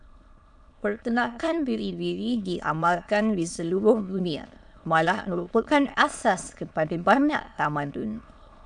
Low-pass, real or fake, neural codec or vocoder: 9.9 kHz; fake; autoencoder, 22.05 kHz, a latent of 192 numbers a frame, VITS, trained on many speakers